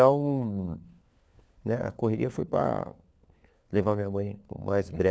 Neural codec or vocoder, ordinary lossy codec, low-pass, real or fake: codec, 16 kHz, 4 kbps, FunCodec, trained on LibriTTS, 50 frames a second; none; none; fake